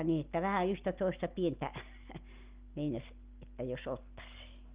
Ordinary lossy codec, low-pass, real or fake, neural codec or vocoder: Opus, 32 kbps; 3.6 kHz; real; none